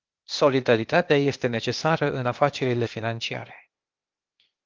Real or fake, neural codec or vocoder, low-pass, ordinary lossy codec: fake; codec, 16 kHz, 0.8 kbps, ZipCodec; 7.2 kHz; Opus, 24 kbps